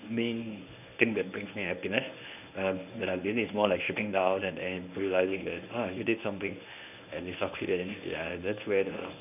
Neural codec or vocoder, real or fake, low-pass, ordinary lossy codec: codec, 24 kHz, 0.9 kbps, WavTokenizer, medium speech release version 1; fake; 3.6 kHz; none